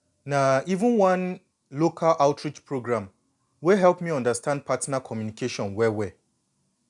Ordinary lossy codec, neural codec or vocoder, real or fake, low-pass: none; none; real; 10.8 kHz